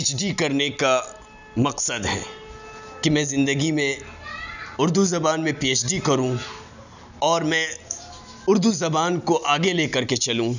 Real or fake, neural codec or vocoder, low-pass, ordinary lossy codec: real; none; 7.2 kHz; none